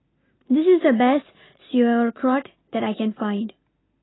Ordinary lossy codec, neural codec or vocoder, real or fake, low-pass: AAC, 16 kbps; none; real; 7.2 kHz